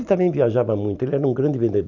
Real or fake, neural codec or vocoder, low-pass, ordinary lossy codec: real; none; 7.2 kHz; none